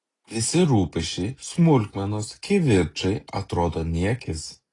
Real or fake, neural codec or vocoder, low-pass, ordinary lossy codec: real; none; 10.8 kHz; AAC, 32 kbps